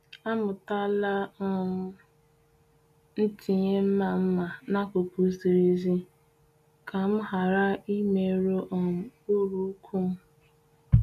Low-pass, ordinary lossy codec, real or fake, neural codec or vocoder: 14.4 kHz; none; real; none